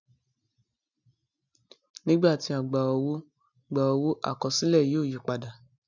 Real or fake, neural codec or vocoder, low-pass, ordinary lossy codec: real; none; 7.2 kHz; none